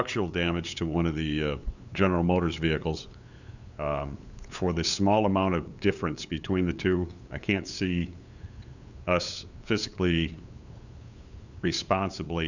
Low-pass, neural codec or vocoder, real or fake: 7.2 kHz; codec, 16 kHz, 8 kbps, FunCodec, trained on LibriTTS, 25 frames a second; fake